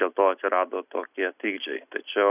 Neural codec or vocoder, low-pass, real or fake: none; 3.6 kHz; real